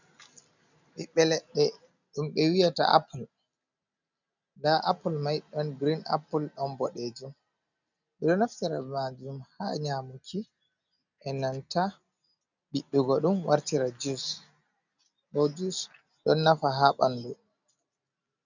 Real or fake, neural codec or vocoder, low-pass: real; none; 7.2 kHz